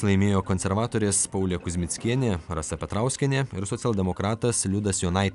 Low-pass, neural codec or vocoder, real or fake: 10.8 kHz; none; real